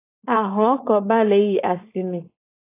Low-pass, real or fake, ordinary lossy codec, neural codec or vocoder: 3.6 kHz; fake; AAC, 24 kbps; codec, 16 kHz, 4.8 kbps, FACodec